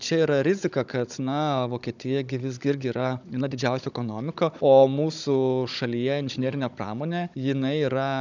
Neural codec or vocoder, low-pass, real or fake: codec, 16 kHz, 16 kbps, FunCodec, trained on Chinese and English, 50 frames a second; 7.2 kHz; fake